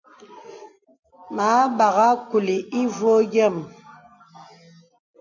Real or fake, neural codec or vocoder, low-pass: real; none; 7.2 kHz